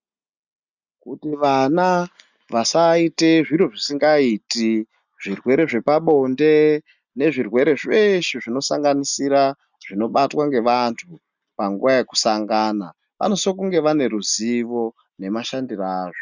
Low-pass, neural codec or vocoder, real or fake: 7.2 kHz; none; real